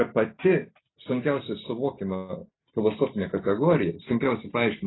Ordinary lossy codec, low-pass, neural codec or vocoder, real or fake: AAC, 16 kbps; 7.2 kHz; none; real